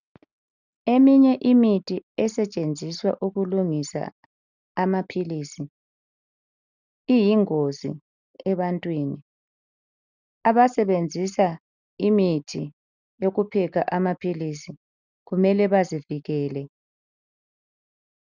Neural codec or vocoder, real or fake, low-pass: none; real; 7.2 kHz